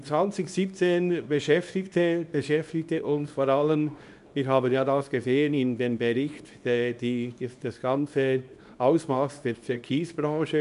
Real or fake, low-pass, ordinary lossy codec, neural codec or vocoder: fake; 10.8 kHz; none; codec, 24 kHz, 0.9 kbps, WavTokenizer, small release